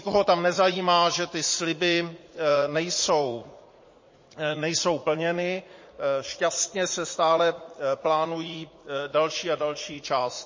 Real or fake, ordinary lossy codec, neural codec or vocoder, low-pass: fake; MP3, 32 kbps; vocoder, 44.1 kHz, 80 mel bands, Vocos; 7.2 kHz